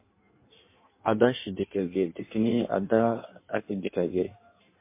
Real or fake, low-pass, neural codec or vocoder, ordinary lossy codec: fake; 3.6 kHz; codec, 16 kHz in and 24 kHz out, 1.1 kbps, FireRedTTS-2 codec; MP3, 24 kbps